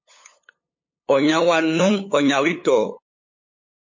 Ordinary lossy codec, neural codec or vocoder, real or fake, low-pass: MP3, 32 kbps; codec, 16 kHz, 8 kbps, FunCodec, trained on LibriTTS, 25 frames a second; fake; 7.2 kHz